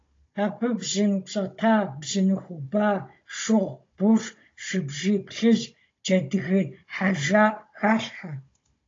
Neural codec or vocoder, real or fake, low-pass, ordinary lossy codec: codec, 16 kHz, 16 kbps, FunCodec, trained on Chinese and English, 50 frames a second; fake; 7.2 kHz; AAC, 32 kbps